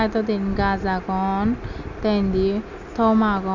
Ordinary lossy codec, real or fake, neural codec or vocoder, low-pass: none; real; none; 7.2 kHz